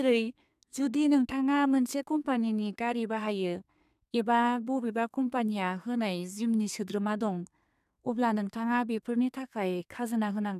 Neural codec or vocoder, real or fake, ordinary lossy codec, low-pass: codec, 32 kHz, 1.9 kbps, SNAC; fake; none; 14.4 kHz